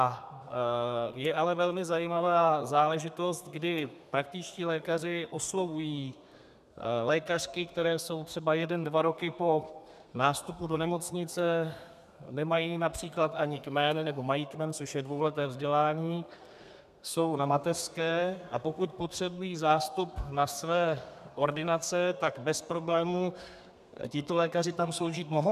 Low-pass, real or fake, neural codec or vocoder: 14.4 kHz; fake; codec, 32 kHz, 1.9 kbps, SNAC